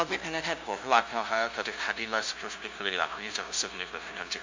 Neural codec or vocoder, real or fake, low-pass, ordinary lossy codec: codec, 16 kHz, 0.5 kbps, FunCodec, trained on LibriTTS, 25 frames a second; fake; 7.2 kHz; MP3, 64 kbps